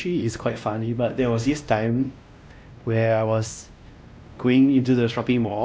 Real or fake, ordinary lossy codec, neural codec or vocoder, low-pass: fake; none; codec, 16 kHz, 1 kbps, X-Codec, WavLM features, trained on Multilingual LibriSpeech; none